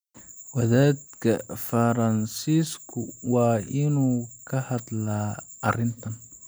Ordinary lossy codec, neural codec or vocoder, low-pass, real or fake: none; none; none; real